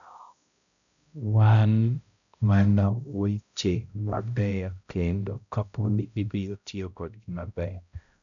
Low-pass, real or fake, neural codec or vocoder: 7.2 kHz; fake; codec, 16 kHz, 0.5 kbps, X-Codec, HuBERT features, trained on balanced general audio